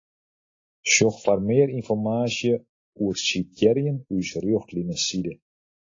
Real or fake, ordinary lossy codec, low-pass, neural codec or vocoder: real; AAC, 32 kbps; 7.2 kHz; none